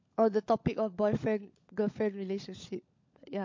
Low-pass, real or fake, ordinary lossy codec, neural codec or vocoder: 7.2 kHz; fake; MP3, 48 kbps; codec, 16 kHz, 16 kbps, FunCodec, trained on LibriTTS, 50 frames a second